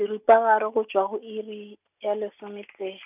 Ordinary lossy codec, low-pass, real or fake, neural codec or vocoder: none; 3.6 kHz; real; none